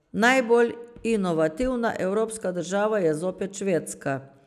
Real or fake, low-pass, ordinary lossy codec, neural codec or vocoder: real; 14.4 kHz; none; none